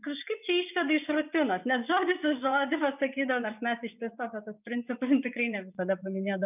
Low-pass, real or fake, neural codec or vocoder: 3.6 kHz; fake; vocoder, 24 kHz, 100 mel bands, Vocos